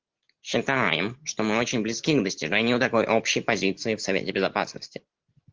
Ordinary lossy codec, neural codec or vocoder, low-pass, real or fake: Opus, 16 kbps; none; 7.2 kHz; real